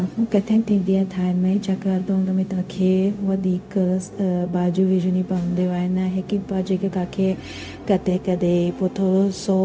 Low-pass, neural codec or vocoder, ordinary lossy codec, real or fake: none; codec, 16 kHz, 0.4 kbps, LongCat-Audio-Codec; none; fake